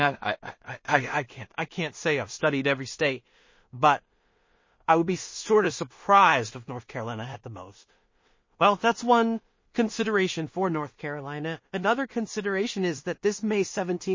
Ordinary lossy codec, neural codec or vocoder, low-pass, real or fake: MP3, 32 kbps; codec, 16 kHz in and 24 kHz out, 0.4 kbps, LongCat-Audio-Codec, two codebook decoder; 7.2 kHz; fake